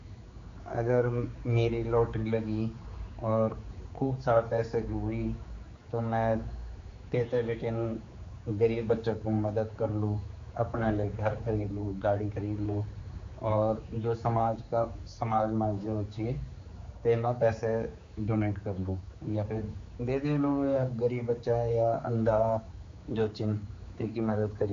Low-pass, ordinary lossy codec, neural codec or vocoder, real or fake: 7.2 kHz; AAC, 48 kbps; codec, 16 kHz, 4 kbps, X-Codec, HuBERT features, trained on general audio; fake